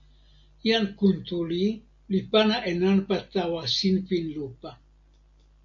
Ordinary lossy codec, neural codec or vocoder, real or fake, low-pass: MP3, 48 kbps; none; real; 7.2 kHz